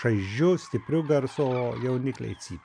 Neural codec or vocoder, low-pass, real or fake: none; 9.9 kHz; real